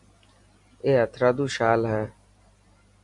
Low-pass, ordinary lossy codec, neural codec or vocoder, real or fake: 10.8 kHz; AAC, 64 kbps; none; real